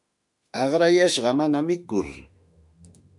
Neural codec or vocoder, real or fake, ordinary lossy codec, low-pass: autoencoder, 48 kHz, 32 numbers a frame, DAC-VAE, trained on Japanese speech; fake; MP3, 96 kbps; 10.8 kHz